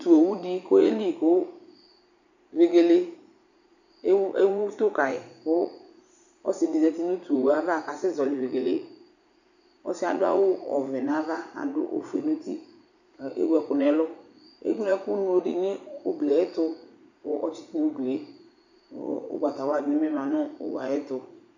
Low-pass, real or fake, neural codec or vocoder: 7.2 kHz; fake; vocoder, 44.1 kHz, 80 mel bands, Vocos